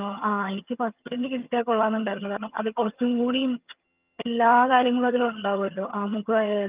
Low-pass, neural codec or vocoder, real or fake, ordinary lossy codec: 3.6 kHz; vocoder, 22.05 kHz, 80 mel bands, HiFi-GAN; fake; Opus, 24 kbps